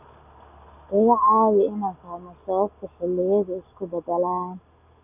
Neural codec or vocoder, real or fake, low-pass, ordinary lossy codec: none; real; 3.6 kHz; none